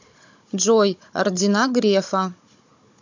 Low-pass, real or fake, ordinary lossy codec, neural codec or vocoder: 7.2 kHz; fake; MP3, 64 kbps; codec, 16 kHz, 16 kbps, FunCodec, trained on Chinese and English, 50 frames a second